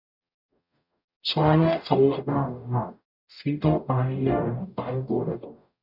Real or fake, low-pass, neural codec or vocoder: fake; 5.4 kHz; codec, 44.1 kHz, 0.9 kbps, DAC